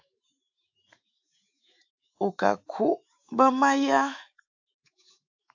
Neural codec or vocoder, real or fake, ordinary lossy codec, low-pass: autoencoder, 48 kHz, 128 numbers a frame, DAC-VAE, trained on Japanese speech; fake; AAC, 48 kbps; 7.2 kHz